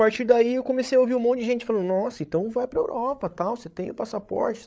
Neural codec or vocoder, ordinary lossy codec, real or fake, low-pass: codec, 16 kHz, 8 kbps, FreqCodec, larger model; none; fake; none